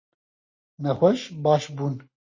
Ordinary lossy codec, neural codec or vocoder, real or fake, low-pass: MP3, 32 kbps; none; real; 7.2 kHz